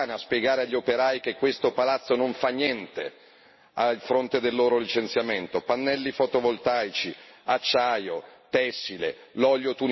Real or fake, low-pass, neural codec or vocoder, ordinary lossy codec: real; 7.2 kHz; none; MP3, 24 kbps